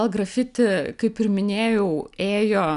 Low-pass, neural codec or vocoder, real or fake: 10.8 kHz; none; real